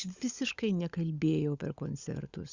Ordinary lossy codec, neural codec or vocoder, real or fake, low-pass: Opus, 64 kbps; codec, 16 kHz, 16 kbps, FunCodec, trained on LibriTTS, 50 frames a second; fake; 7.2 kHz